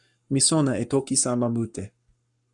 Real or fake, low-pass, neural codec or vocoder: fake; 10.8 kHz; codec, 44.1 kHz, 7.8 kbps, Pupu-Codec